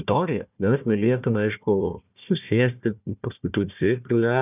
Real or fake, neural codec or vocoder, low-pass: fake; codec, 16 kHz, 1 kbps, FunCodec, trained on Chinese and English, 50 frames a second; 3.6 kHz